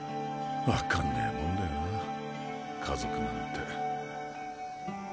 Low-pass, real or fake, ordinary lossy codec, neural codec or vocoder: none; real; none; none